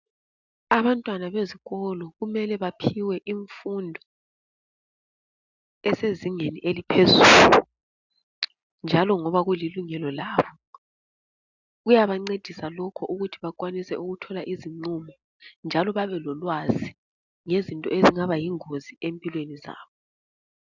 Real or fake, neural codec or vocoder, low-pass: real; none; 7.2 kHz